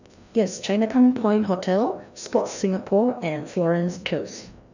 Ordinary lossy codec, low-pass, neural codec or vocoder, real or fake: none; 7.2 kHz; codec, 16 kHz, 1 kbps, FreqCodec, larger model; fake